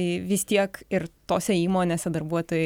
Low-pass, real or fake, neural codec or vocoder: 19.8 kHz; real; none